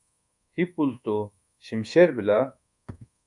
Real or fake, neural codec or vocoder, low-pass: fake; codec, 24 kHz, 1.2 kbps, DualCodec; 10.8 kHz